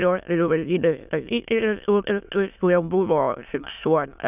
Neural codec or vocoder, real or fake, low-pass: autoencoder, 22.05 kHz, a latent of 192 numbers a frame, VITS, trained on many speakers; fake; 3.6 kHz